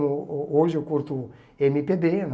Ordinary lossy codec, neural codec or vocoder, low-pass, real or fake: none; none; none; real